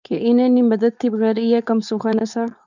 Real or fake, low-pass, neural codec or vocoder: fake; 7.2 kHz; codec, 16 kHz, 4.8 kbps, FACodec